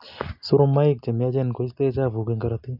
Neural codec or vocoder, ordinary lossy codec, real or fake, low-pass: none; none; real; 5.4 kHz